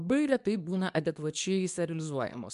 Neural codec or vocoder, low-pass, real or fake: codec, 24 kHz, 0.9 kbps, WavTokenizer, medium speech release version 2; 10.8 kHz; fake